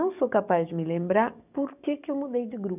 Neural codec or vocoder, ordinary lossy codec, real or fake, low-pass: codec, 44.1 kHz, 7.8 kbps, DAC; none; fake; 3.6 kHz